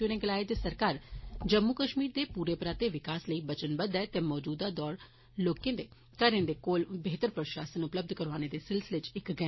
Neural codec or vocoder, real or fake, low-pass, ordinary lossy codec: none; real; 7.2 kHz; MP3, 24 kbps